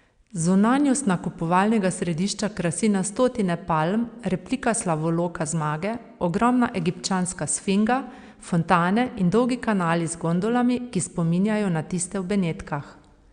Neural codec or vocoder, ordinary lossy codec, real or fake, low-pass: none; Opus, 64 kbps; real; 9.9 kHz